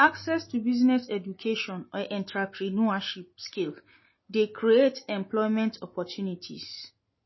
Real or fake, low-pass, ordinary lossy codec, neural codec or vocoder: real; 7.2 kHz; MP3, 24 kbps; none